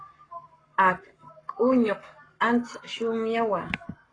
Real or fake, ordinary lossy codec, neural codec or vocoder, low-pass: fake; AAC, 32 kbps; codec, 44.1 kHz, 7.8 kbps, Pupu-Codec; 9.9 kHz